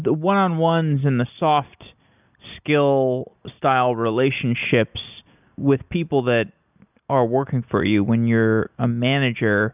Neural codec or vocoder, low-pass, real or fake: none; 3.6 kHz; real